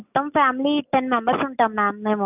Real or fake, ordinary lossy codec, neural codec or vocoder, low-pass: real; none; none; 3.6 kHz